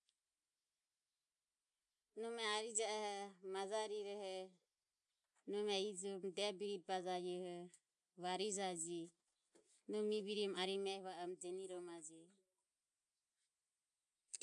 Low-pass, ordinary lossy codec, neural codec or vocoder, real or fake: 10.8 kHz; none; none; real